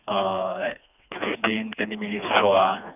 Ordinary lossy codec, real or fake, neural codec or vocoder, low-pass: none; fake; codec, 16 kHz, 2 kbps, FreqCodec, smaller model; 3.6 kHz